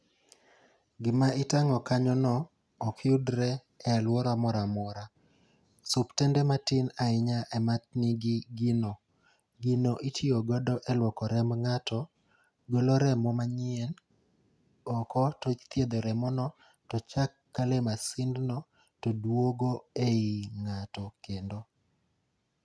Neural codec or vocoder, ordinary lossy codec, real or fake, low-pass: none; none; real; none